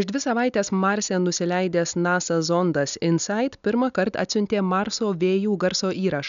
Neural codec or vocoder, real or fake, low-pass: none; real; 7.2 kHz